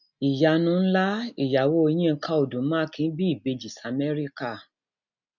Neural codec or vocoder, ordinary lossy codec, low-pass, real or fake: none; none; 7.2 kHz; real